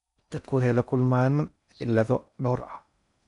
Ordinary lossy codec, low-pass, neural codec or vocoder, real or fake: none; 10.8 kHz; codec, 16 kHz in and 24 kHz out, 0.6 kbps, FocalCodec, streaming, 4096 codes; fake